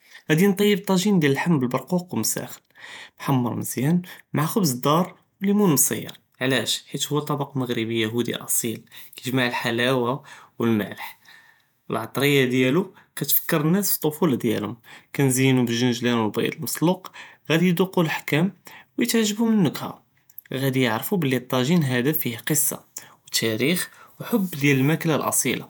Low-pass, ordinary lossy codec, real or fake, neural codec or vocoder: none; none; fake; vocoder, 48 kHz, 128 mel bands, Vocos